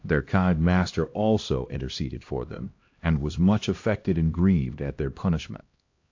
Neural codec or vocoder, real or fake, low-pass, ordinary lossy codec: codec, 16 kHz, 1 kbps, X-Codec, HuBERT features, trained on LibriSpeech; fake; 7.2 kHz; AAC, 48 kbps